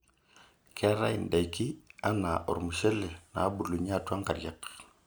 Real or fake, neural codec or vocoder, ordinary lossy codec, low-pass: real; none; none; none